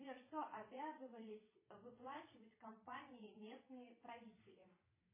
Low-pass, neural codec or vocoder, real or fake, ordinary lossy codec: 3.6 kHz; vocoder, 22.05 kHz, 80 mel bands, Vocos; fake; MP3, 16 kbps